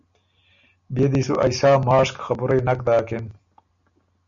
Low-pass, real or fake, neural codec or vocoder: 7.2 kHz; real; none